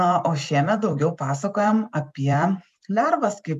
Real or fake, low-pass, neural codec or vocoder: fake; 14.4 kHz; vocoder, 44.1 kHz, 128 mel bands every 256 samples, BigVGAN v2